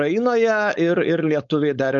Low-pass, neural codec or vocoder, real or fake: 7.2 kHz; codec, 16 kHz, 4.8 kbps, FACodec; fake